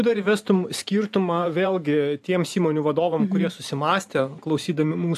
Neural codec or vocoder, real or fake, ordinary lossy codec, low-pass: vocoder, 44.1 kHz, 128 mel bands every 512 samples, BigVGAN v2; fake; AAC, 96 kbps; 14.4 kHz